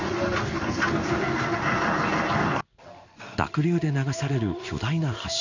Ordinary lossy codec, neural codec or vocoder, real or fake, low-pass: none; codec, 16 kHz in and 24 kHz out, 1 kbps, XY-Tokenizer; fake; 7.2 kHz